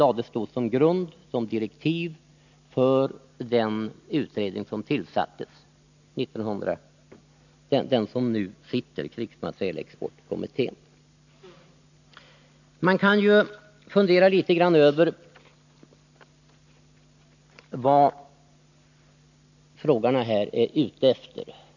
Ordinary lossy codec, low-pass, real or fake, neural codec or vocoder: none; 7.2 kHz; real; none